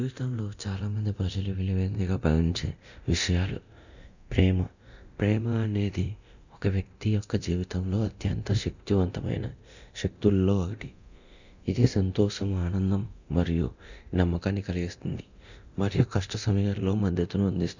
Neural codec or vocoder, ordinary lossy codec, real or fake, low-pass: codec, 24 kHz, 0.9 kbps, DualCodec; none; fake; 7.2 kHz